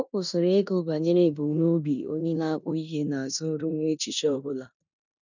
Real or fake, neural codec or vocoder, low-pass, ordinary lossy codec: fake; codec, 16 kHz in and 24 kHz out, 0.9 kbps, LongCat-Audio-Codec, four codebook decoder; 7.2 kHz; none